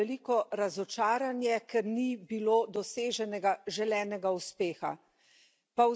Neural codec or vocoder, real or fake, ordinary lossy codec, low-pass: none; real; none; none